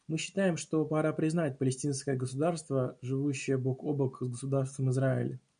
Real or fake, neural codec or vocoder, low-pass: real; none; 9.9 kHz